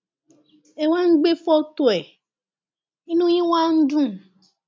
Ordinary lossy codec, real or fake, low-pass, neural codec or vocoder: none; real; none; none